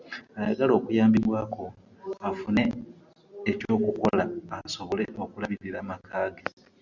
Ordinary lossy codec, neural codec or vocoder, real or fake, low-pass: Opus, 64 kbps; none; real; 7.2 kHz